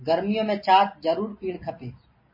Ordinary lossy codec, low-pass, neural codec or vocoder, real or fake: MP3, 24 kbps; 5.4 kHz; none; real